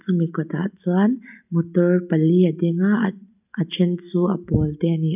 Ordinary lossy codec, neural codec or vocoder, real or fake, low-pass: none; none; real; 3.6 kHz